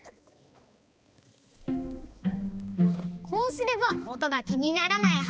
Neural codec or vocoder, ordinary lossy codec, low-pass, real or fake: codec, 16 kHz, 2 kbps, X-Codec, HuBERT features, trained on balanced general audio; none; none; fake